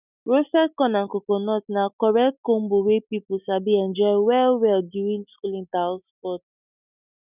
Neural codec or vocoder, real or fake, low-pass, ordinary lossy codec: none; real; 3.6 kHz; none